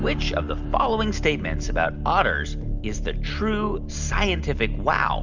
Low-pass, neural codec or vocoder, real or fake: 7.2 kHz; none; real